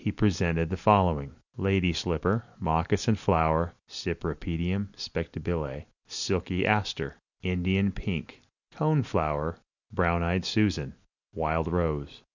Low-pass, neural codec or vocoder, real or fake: 7.2 kHz; none; real